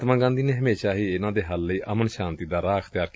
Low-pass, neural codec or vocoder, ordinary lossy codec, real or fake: none; none; none; real